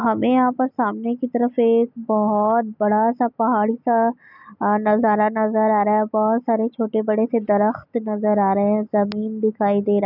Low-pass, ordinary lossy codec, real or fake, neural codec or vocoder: 5.4 kHz; none; real; none